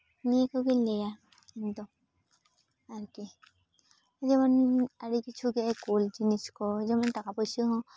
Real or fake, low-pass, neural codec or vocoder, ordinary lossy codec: real; none; none; none